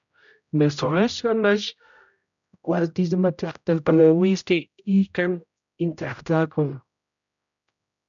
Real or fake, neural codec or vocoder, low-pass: fake; codec, 16 kHz, 0.5 kbps, X-Codec, HuBERT features, trained on general audio; 7.2 kHz